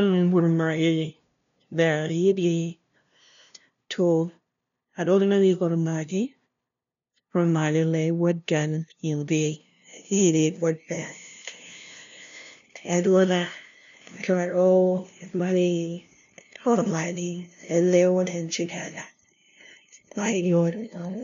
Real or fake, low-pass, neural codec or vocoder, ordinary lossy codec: fake; 7.2 kHz; codec, 16 kHz, 0.5 kbps, FunCodec, trained on LibriTTS, 25 frames a second; none